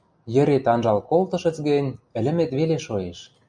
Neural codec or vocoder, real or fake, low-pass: none; real; 9.9 kHz